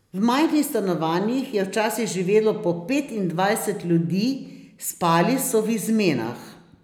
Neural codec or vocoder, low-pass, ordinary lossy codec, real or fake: none; 19.8 kHz; none; real